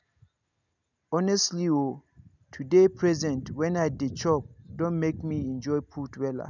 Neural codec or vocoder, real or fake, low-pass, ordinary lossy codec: none; real; 7.2 kHz; none